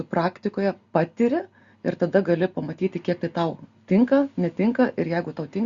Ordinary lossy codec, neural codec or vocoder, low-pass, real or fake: Opus, 64 kbps; none; 7.2 kHz; real